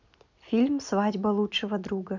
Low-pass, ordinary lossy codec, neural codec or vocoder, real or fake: 7.2 kHz; none; none; real